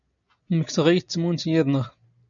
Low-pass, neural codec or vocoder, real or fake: 7.2 kHz; none; real